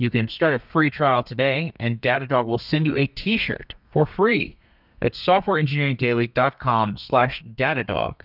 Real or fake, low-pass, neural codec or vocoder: fake; 5.4 kHz; codec, 32 kHz, 1.9 kbps, SNAC